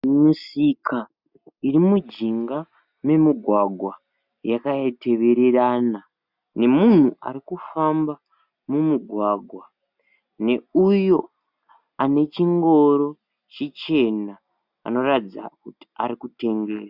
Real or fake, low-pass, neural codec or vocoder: real; 5.4 kHz; none